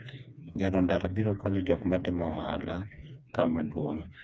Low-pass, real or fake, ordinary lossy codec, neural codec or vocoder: none; fake; none; codec, 16 kHz, 2 kbps, FreqCodec, smaller model